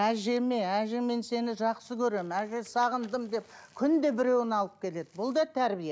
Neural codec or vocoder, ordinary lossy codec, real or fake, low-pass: none; none; real; none